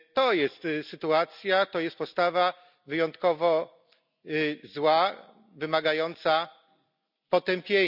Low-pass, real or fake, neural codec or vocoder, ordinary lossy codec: 5.4 kHz; real; none; none